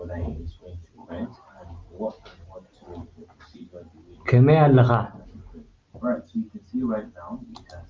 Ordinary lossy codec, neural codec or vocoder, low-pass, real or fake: Opus, 32 kbps; none; 7.2 kHz; real